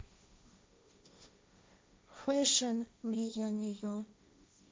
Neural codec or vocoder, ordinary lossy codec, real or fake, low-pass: codec, 16 kHz, 1.1 kbps, Voila-Tokenizer; none; fake; none